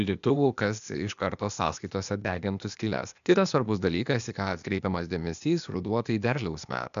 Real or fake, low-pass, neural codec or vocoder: fake; 7.2 kHz; codec, 16 kHz, 0.8 kbps, ZipCodec